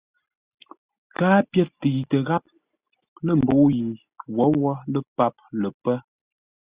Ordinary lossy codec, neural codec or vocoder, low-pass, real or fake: Opus, 64 kbps; none; 3.6 kHz; real